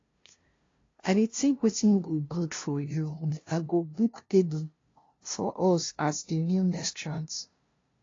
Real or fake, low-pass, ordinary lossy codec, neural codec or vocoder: fake; 7.2 kHz; AAC, 32 kbps; codec, 16 kHz, 0.5 kbps, FunCodec, trained on LibriTTS, 25 frames a second